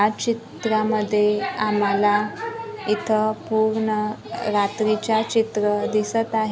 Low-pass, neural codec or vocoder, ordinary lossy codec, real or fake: none; none; none; real